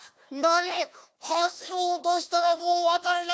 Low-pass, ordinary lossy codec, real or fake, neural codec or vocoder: none; none; fake; codec, 16 kHz, 1 kbps, FunCodec, trained on Chinese and English, 50 frames a second